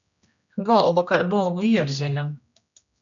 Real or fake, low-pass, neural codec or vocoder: fake; 7.2 kHz; codec, 16 kHz, 1 kbps, X-Codec, HuBERT features, trained on general audio